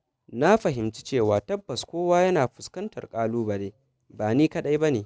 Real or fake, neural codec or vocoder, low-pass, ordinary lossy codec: real; none; none; none